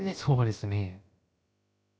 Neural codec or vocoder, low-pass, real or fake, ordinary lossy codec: codec, 16 kHz, about 1 kbps, DyCAST, with the encoder's durations; none; fake; none